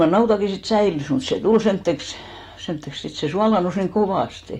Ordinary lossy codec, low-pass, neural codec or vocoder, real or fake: AAC, 48 kbps; 19.8 kHz; none; real